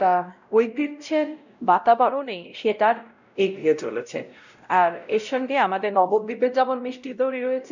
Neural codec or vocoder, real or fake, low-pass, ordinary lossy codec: codec, 16 kHz, 0.5 kbps, X-Codec, WavLM features, trained on Multilingual LibriSpeech; fake; 7.2 kHz; none